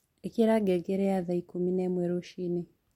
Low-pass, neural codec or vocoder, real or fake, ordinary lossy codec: 19.8 kHz; none; real; MP3, 64 kbps